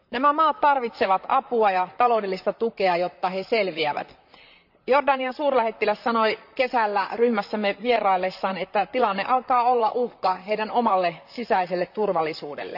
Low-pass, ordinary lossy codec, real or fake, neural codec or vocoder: 5.4 kHz; none; fake; vocoder, 44.1 kHz, 128 mel bands, Pupu-Vocoder